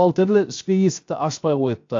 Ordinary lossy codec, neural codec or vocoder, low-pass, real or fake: AAC, 48 kbps; codec, 16 kHz, 0.7 kbps, FocalCodec; 7.2 kHz; fake